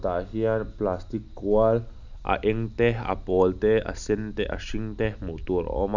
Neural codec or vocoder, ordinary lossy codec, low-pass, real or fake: none; none; 7.2 kHz; real